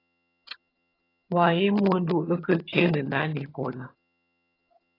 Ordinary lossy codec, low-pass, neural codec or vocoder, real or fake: AAC, 24 kbps; 5.4 kHz; vocoder, 22.05 kHz, 80 mel bands, HiFi-GAN; fake